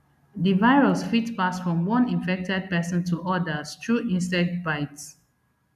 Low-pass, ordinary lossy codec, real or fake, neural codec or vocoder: 14.4 kHz; none; real; none